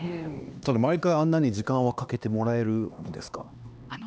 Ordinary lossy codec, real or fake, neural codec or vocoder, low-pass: none; fake; codec, 16 kHz, 2 kbps, X-Codec, HuBERT features, trained on LibriSpeech; none